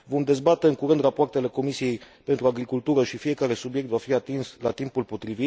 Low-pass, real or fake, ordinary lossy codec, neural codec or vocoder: none; real; none; none